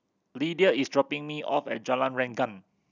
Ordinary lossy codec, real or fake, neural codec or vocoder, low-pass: none; real; none; 7.2 kHz